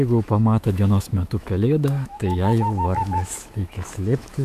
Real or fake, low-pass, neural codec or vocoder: fake; 14.4 kHz; autoencoder, 48 kHz, 128 numbers a frame, DAC-VAE, trained on Japanese speech